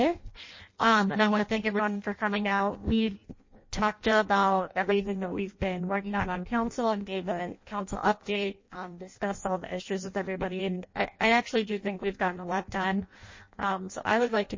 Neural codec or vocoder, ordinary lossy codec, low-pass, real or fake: codec, 16 kHz in and 24 kHz out, 0.6 kbps, FireRedTTS-2 codec; MP3, 32 kbps; 7.2 kHz; fake